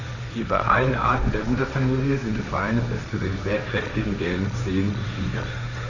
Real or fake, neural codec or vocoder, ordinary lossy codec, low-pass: fake; codec, 16 kHz, 1.1 kbps, Voila-Tokenizer; none; 7.2 kHz